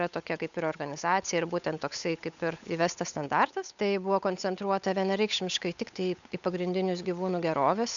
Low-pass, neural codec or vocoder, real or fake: 7.2 kHz; codec, 16 kHz, 8 kbps, FunCodec, trained on Chinese and English, 25 frames a second; fake